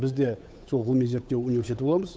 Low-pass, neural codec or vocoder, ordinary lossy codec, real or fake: none; codec, 16 kHz, 8 kbps, FunCodec, trained on Chinese and English, 25 frames a second; none; fake